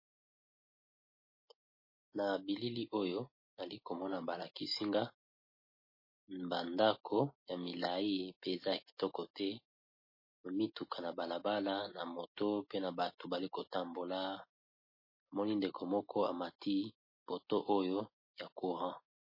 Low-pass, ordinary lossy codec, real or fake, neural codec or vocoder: 5.4 kHz; MP3, 24 kbps; real; none